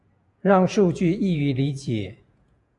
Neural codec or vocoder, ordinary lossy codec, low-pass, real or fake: vocoder, 48 kHz, 128 mel bands, Vocos; AAC, 64 kbps; 10.8 kHz; fake